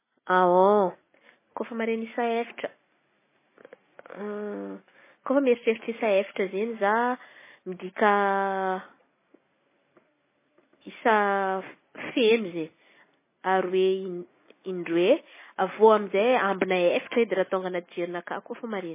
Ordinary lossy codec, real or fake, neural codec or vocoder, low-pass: MP3, 16 kbps; real; none; 3.6 kHz